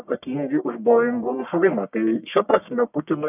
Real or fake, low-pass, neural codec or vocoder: fake; 3.6 kHz; codec, 44.1 kHz, 1.7 kbps, Pupu-Codec